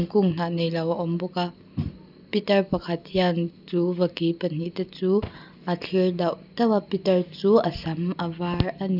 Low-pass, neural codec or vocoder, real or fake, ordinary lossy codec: 5.4 kHz; none; real; none